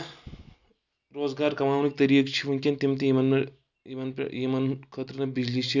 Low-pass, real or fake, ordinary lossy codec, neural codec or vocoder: 7.2 kHz; real; none; none